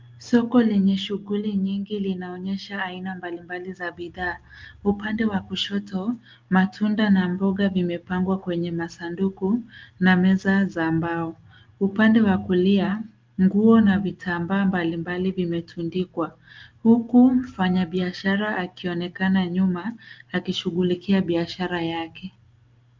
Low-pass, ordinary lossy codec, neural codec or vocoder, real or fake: 7.2 kHz; Opus, 32 kbps; none; real